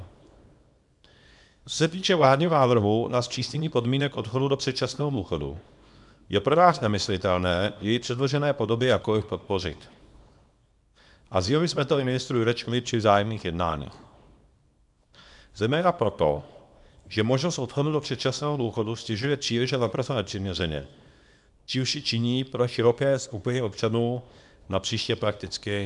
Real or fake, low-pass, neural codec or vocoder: fake; 10.8 kHz; codec, 24 kHz, 0.9 kbps, WavTokenizer, small release